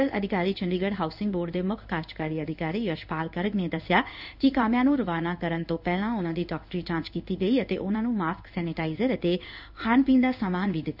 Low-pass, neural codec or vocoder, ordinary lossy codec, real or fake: 5.4 kHz; codec, 16 kHz in and 24 kHz out, 1 kbps, XY-Tokenizer; none; fake